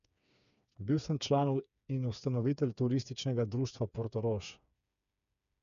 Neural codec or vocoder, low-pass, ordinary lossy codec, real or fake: codec, 16 kHz, 4 kbps, FreqCodec, smaller model; 7.2 kHz; none; fake